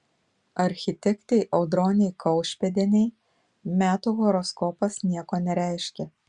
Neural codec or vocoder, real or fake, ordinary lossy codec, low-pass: none; real; Opus, 64 kbps; 10.8 kHz